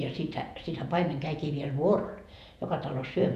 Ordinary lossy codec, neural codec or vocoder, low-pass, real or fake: none; vocoder, 48 kHz, 128 mel bands, Vocos; 14.4 kHz; fake